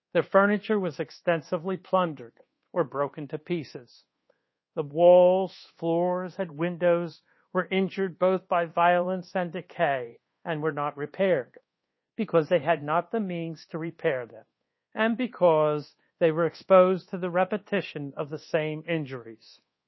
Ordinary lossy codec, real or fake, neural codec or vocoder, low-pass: MP3, 24 kbps; fake; codec, 24 kHz, 1.2 kbps, DualCodec; 7.2 kHz